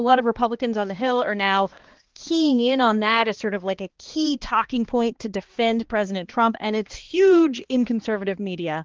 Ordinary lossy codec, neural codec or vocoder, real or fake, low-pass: Opus, 16 kbps; codec, 16 kHz, 2 kbps, X-Codec, HuBERT features, trained on balanced general audio; fake; 7.2 kHz